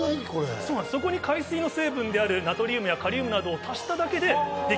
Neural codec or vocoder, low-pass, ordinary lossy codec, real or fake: none; none; none; real